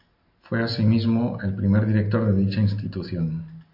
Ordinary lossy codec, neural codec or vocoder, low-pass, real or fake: MP3, 32 kbps; none; 5.4 kHz; real